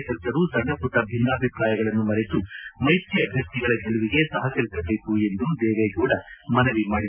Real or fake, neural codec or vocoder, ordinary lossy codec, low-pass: real; none; none; 3.6 kHz